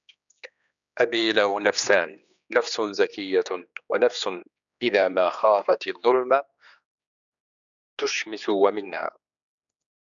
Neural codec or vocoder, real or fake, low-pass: codec, 16 kHz, 2 kbps, X-Codec, HuBERT features, trained on general audio; fake; 7.2 kHz